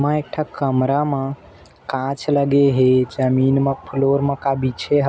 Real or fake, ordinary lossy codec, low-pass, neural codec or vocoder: real; none; none; none